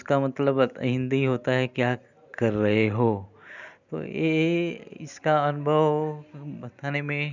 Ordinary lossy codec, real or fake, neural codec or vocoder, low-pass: none; real; none; 7.2 kHz